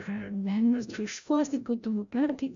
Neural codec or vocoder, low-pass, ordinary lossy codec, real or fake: codec, 16 kHz, 0.5 kbps, FreqCodec, larger model; 7.2 kHz; AAC, 64 kbps; fake